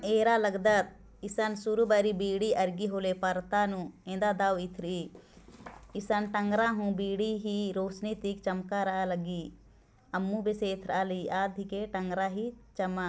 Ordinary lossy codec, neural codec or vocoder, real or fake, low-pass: none; none; real; none